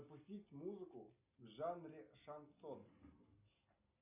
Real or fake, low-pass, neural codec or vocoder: real; 3.6 kHz; none